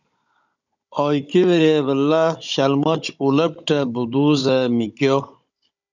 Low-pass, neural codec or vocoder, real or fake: 7.2 kHz; codec, 16 kHz, 16 kbps, FunCodec, trained on Chinese and English, 50 frames a second; fake